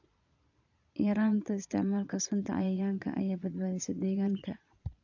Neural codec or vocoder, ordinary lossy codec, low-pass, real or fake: vocoder, 22.05 kHz, 80 mel bands, Vocos; AAC, 48 kbps; 7.2 kHz; fake